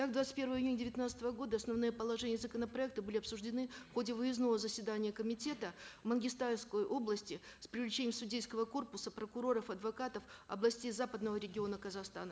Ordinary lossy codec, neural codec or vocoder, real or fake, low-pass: none; none; real; none